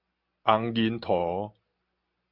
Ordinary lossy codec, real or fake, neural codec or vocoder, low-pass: Opus, 64 kbps; real; none; 5.4 kHz